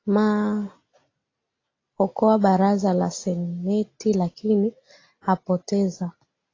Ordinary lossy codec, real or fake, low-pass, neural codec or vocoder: AAC, 32 kbps; real; 7.2 kHz; none